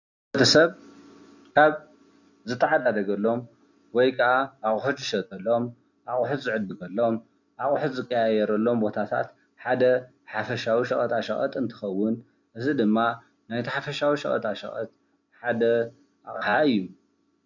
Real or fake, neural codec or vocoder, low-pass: real; none; 7.2 kHz